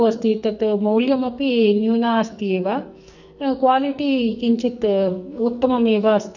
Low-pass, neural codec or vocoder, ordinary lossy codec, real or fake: 7.2 kHz; codec, 44.1 kHz, 2.6 kbps, SNAC; none; fake